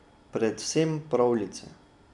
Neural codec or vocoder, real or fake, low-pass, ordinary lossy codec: none; real; 10.8 kHz; none